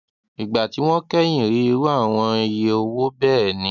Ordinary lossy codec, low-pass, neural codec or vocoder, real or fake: none; 7.2 kHz; none; real